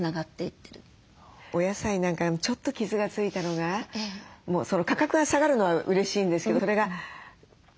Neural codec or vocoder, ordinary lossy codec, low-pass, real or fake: none; none; none; real